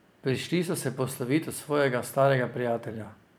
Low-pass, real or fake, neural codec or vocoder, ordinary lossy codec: none; fake; vocoder, 44.1 kHz, 128 mel bands every 256 samples, BigVGAN v2; none